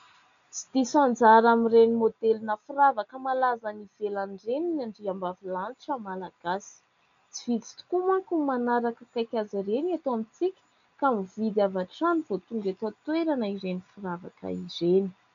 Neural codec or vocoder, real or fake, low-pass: none; real; 7.2 kHz